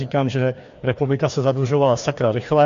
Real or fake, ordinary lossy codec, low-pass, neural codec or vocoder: fake; AAC, 64 kbps; 7.2 kHz; codec, 16 kHz, 2 kbps, FreqCodec, larger model